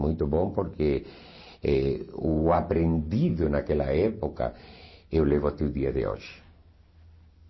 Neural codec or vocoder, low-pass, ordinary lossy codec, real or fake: none; 7.2 kHz; MP3, 24 kbps; real